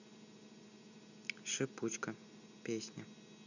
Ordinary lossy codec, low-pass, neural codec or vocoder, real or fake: none; 7.2 kHz; none; real